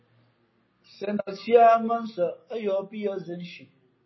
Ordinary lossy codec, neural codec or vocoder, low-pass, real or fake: MP3, 24 kbps; none; 7.2 kHz; real